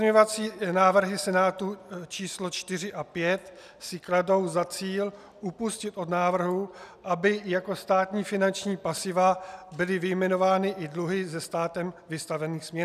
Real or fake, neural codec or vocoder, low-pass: real; none; 14.4 kHz